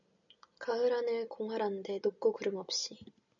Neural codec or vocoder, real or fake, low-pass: none; real; 7.2 kHz